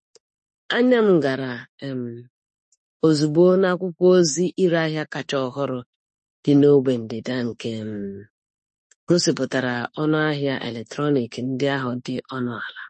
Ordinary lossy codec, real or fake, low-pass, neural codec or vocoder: MP3, 32 kbps; fake; 10.8 kHz; autoencoder, 48 kHz, 32 numbers a frame, DAC-VAE, trained on Japanese speech